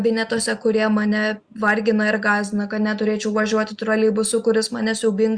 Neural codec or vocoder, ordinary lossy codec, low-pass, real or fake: none; Opus, 64 kbps; 9.9 kHz; real